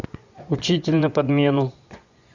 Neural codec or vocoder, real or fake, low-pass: vocoder, 24 kHz, 100 mel bands, Vocos; fake; 7.2 kHz